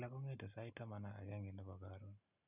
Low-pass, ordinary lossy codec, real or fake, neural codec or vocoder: 3.6 kHz; none; real; none